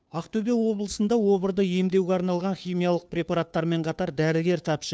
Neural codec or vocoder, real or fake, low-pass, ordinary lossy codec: codec, 16 kHz, 2 kbps, FunCodec, trained on Chinese and English, 25 frames a second; fake; none; none